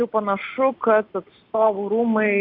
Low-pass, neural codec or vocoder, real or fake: 5.4 kHz; none; real